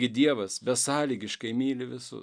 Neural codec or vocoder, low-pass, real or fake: none; 9.9 kHz; real